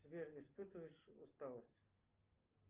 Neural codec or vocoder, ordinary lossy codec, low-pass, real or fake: none; Opus, 24 kbps; 3.6 kHz; real